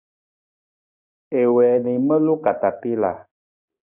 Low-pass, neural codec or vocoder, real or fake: 3.6 kHz; codec, 16 kHz, 6 kbps, DAC; fake